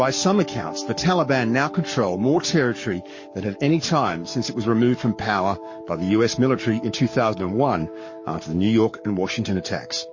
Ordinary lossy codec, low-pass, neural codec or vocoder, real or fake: MP3, 32 kbps; 7.2 kHz; codec, 44.1 kHz, 7.8 kbps, Pupu-Codec; fake